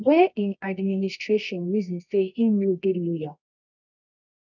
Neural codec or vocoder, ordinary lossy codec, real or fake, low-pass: codec, 24 kHz, 0.9 kbps, WavTokenizer, medium music audio release; none; fake; 7.2 kHz